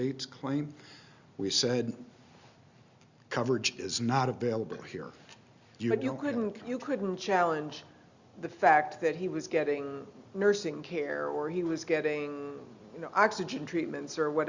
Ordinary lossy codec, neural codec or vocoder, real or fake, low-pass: Opus, 64 kbps; none; real; 7.2 kHz